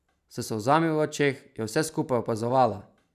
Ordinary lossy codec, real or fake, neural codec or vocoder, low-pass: none; real; none; 14.4 kHz